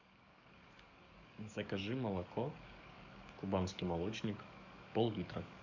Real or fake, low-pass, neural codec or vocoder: fake; 7.2 kHz; codec, 44.1 kHz, 7.8 kbps, DAC